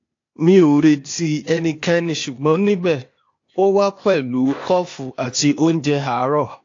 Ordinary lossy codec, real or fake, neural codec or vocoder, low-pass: AAC, 48 kbps; fake; codec, 16 kHz, 0.8 kbps, ZipCodec; 7.2 kHz